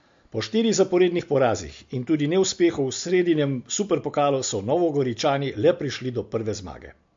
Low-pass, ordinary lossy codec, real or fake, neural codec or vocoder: 7.2 kHz; MP3, 48 kbps; real; none